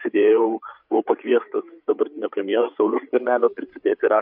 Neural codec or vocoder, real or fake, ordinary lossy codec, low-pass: codec, 16 kHz, 8 kbps, FreqCodec, larger model; fake; MP3, 48 kbps; 5.4 kHz